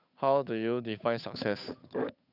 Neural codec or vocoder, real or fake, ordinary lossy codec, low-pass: codec, 16 kHz, 8 kbps, FunCodec, trained on Chinese and English, 25 frames a second; fake; none; 5.4 kHz